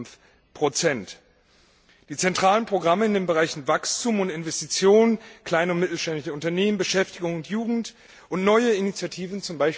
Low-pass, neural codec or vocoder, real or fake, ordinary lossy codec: none; none; real; none